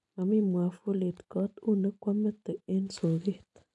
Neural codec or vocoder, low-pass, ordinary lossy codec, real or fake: none; 10.8 kHz; none; real